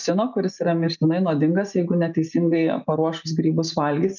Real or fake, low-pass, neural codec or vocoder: real; 7.2 kHz; none